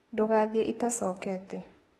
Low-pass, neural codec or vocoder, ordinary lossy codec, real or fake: 19.8 kHz; autoencoder, 48 kHz, 32 numbers a frame, DAC-VAE, trained on Japanese speech; AAC, 32 kbps; fake